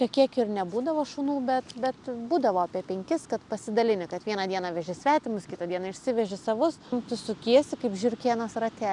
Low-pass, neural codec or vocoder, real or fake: 10.8 kHz; none; real